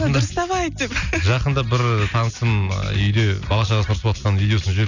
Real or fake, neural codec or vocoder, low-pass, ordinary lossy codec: real; none; 7.2 kHz; none